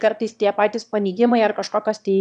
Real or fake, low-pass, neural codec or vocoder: fake; 9.9 kHz; autoencoder, 22.05 kHz, a latent of 192 numbers a frame, VITS, trained on one speaker